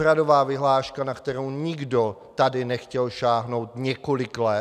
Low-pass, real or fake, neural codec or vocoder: 14.4 kHz; real; none